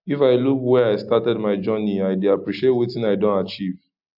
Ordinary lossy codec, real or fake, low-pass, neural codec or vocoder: AAC, 48 kbps; real; 5.4 kHz; none